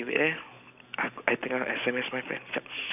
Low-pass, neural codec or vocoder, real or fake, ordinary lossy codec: 3.6 kHz; none; real; none